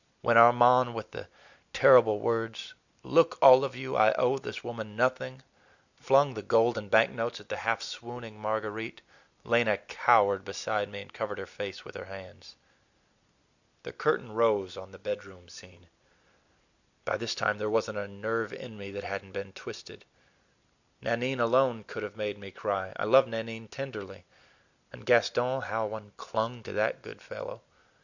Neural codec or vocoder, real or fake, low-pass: none; real; 7.2 kHz